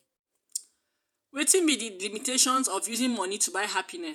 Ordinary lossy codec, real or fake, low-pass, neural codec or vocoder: none; fake; none; vocoder, 48 kHz, 128 mel bands, Vocos